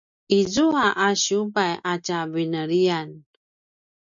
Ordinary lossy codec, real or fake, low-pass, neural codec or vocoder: MP3, 64 kbps; real; 7.2 kHz; none